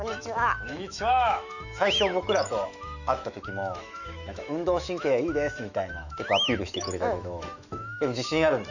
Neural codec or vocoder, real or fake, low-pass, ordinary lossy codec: autoencoder, 48 kHz, 128 numbers a frame, DAC-VAE, trained on Japanese speech; fake; 7.2 kHz; none